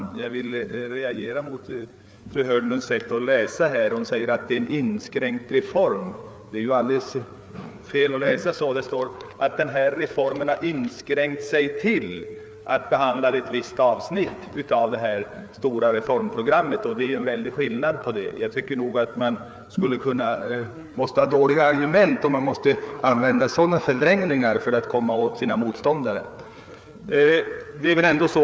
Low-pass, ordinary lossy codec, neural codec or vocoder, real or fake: none; none; codec, 16 kHz, 4 kbps, FreqCodec, larger model; fake